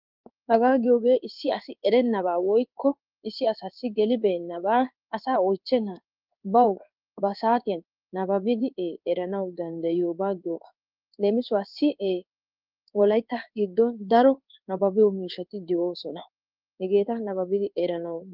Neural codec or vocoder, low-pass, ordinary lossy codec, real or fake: codec, 16 kHz in and 24 kHz out, 1 kbps, XY-Tokenizer; 5.4 kHz; Opus, 32 kbps; fake